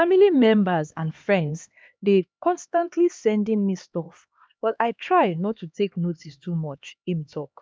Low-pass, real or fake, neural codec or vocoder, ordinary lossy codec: none; fake; codec, 16 kHz, 2 kbps, X-Codec, HuBERT features, trained on LibriSpeech; none